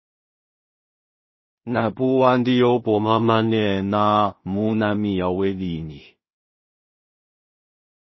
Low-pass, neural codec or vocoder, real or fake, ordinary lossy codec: 7.2 kHz; codec, 16 kHz in and 24 kHz out, 0.4 kbps, LongCat-Audio-Codec, two codebook decoder; fake; MP3, 24 kbps